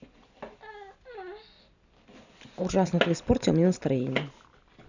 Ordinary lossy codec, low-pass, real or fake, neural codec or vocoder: none; 7.2 kHz; real; none